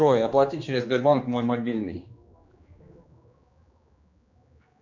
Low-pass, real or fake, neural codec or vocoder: 7.2 kHz; fake; codec, 16 kHz, 2 kbps, X-Codec, HuBERT features, trained on general audio